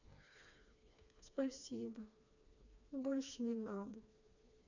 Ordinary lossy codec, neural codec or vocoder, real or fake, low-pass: none; codec, 16 kHz, 2 kbps, FreqCodec, smaller model; fake; 7.2 kHz